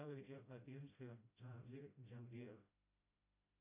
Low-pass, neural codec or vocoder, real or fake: 3.6 kHz; codec, 16 kHz, 0.5 kbps, FreqCodec, smaller model; fake